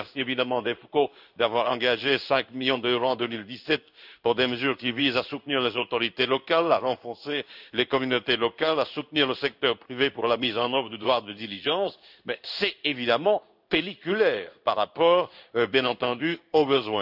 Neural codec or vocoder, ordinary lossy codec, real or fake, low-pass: codec, 16 kHz in and 24 kHz out, 1 kbps, XY-Tokenizer; none; fake; 5.4 kHz